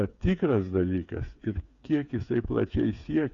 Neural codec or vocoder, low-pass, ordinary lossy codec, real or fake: codec, 16 kHz, 8 kbps, FreqCodec, smaller model; 7.2 kHz; Opus, 64 kbps; fake